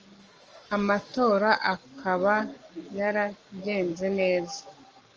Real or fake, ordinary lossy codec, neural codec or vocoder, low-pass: fake; Opus, 24 kbps; codec, 44.1 kHz, 7.8 kbps, Pupu-Codec; 7.2 kHz